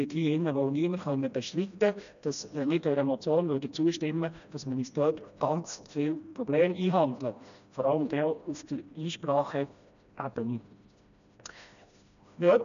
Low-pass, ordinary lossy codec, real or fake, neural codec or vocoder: 7.2 kHz; none; fake; codec, 16 kHz, 1 kbps, FreqCodec, smaller model